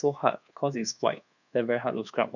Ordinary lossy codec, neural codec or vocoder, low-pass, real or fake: none; codec, 24 kHz, 1.2 kbps, DualCodec; 7.2 kHz; fake